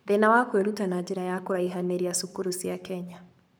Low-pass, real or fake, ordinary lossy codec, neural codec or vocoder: none; fake; none; codec, 44.1 kHz, 7.8 kbps, Pupu-Codec